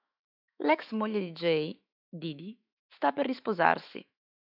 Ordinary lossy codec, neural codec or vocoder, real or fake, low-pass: AAC, 48 kbps; autoencoder, 48 kHz, 128 numbers a frame, DAC-VAE, trained on Japanese speech; fake; 5.4 kHz